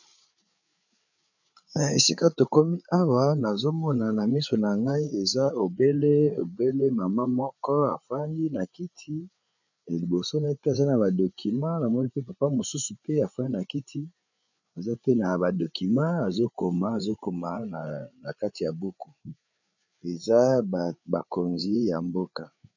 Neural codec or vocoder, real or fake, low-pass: codec, 16 kHz, 8 kbps, FreqCodec, larger model; fake; 7.2 kHz